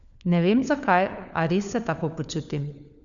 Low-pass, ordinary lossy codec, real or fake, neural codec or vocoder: 7.2 kHz; none; fake; codec, 16 kHz, 4 kbps, FunCodec, trained on LibriTTS, 50 frames a second